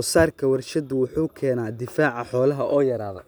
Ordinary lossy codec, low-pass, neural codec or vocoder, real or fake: none; none; none; real